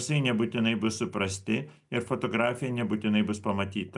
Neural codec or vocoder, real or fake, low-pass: none; real; 10.8 kHz